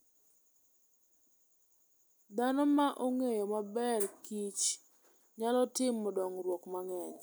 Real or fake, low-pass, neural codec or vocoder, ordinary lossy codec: real; none; none; none